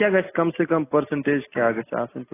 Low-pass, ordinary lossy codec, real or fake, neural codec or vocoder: 3.6 kHz; AAC, 16 kbps; real; none